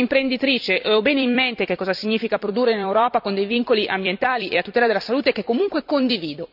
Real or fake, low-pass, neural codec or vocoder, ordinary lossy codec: fake; 5.4 kHz; vocoder, 44.1 kHz, 128 mel bands every 256 samples, BigVGAN v2; none